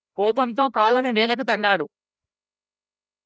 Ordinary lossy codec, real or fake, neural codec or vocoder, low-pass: none; fake; codec, 16 kHz, 0.5 kbps, FreqCodec, larger model; none